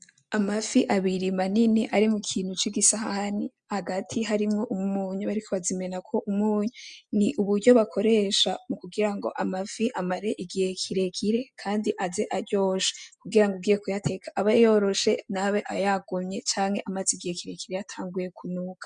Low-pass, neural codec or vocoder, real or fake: 9.9 kHz; none; real